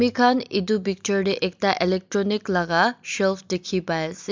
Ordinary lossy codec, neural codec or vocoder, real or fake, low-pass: MP3, 64 kbps; none; real; 7.2 kHz